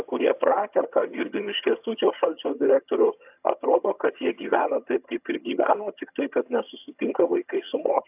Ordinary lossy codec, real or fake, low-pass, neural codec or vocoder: AAC, 32 kbps; fake; 3.6 kHz; vocoder, 22.05 kHz, 80 mel bands, HiFi-GAN